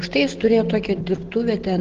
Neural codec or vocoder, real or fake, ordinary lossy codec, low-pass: none; real; Opus, 16 kbps; 7.2 kHz